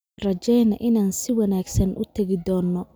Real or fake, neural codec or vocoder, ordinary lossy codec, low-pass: real; none; none; none